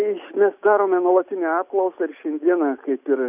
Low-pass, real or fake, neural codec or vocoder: 3.6 kHz; real; none